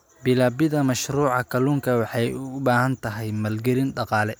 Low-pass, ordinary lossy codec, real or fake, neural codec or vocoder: none; none; real; none